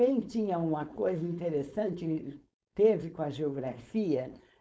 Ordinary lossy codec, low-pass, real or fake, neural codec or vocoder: none; none; fake; codec, 16 kHz, 4.8 kbps, FACodec